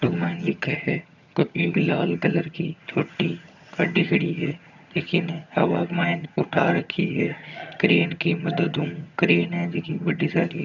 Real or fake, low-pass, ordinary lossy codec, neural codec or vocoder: fake; 7.2 kHz; none; vocoder, 22.05 kHz, 80 mel bands, HiFi-GAN